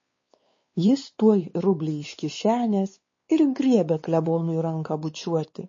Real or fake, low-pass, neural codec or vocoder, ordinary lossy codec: fake; 7.2 kHz; codec, 16 kHz, 4 kbps, X-Codec, WavLM features, trained on Multilingual LibriSpeech; MP3, 32 kbps